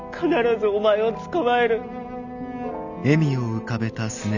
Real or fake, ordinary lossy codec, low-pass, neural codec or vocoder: real; none; 7.2 kHz; none